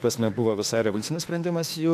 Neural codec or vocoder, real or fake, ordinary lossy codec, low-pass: autoencoder, 48 kHz, 32 numbers a frame, DAC-VAE, trained on Japanese speech; fake; AAC, 64 kbps; 14.4 kHz